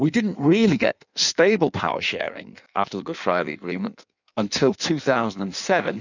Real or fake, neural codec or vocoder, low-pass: fake; codec, 16 kHz in and 24 kHz out, 1.1 kbps, FireRedTTS-2 codec; 7.2 kHz